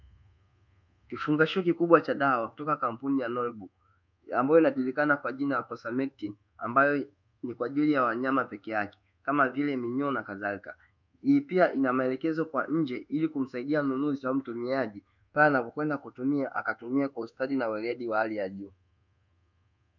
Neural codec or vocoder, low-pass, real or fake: codec, 24 kHz, 1.2 kbps, DualCodec; 7.2 kHz; fake